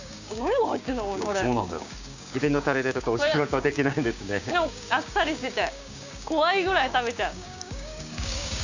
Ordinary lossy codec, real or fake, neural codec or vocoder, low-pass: none; fake; codec, 16 kHz, 6 kbps, DAC; 7.2 kHz